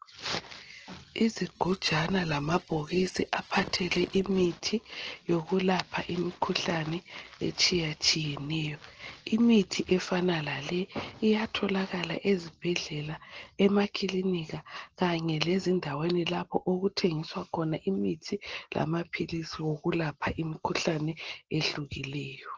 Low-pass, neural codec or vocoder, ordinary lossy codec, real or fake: 7.2 kHz; none; Opus, 16 kbps; real